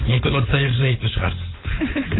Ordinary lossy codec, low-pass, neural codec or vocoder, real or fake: AAC, 16 kbps; 7.2 kHz; codec, 16 kHz, 4.8 kbps, FACodec; fake